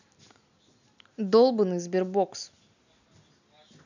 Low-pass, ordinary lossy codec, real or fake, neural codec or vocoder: 7.2 kHz; none; real; none